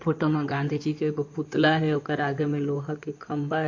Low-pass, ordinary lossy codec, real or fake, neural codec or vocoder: 7.2 kHz; MP3, 48 kbps; fake; codec, 16 kHz in and 24 kHz out, 2.2 kbps, FireRedTTS-2 codec